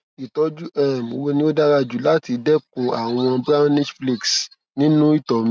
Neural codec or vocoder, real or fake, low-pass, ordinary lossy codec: none; real; none; none